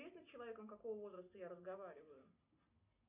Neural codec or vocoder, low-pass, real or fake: none; 3.6 kHz; real